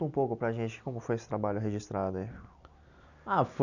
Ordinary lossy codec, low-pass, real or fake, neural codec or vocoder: none; 7.2 kHz; real; none